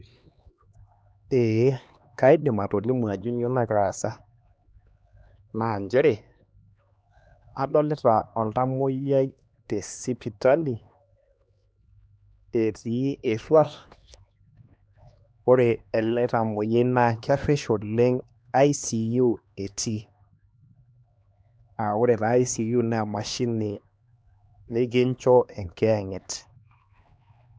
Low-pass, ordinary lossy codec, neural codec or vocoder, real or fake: none; none; codec, 16 kHz, 2 kbps, X-Codec, HuBERT features, trained on LibriSpeech; fake